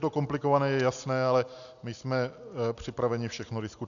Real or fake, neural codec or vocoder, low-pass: real; none; 7.2 kHz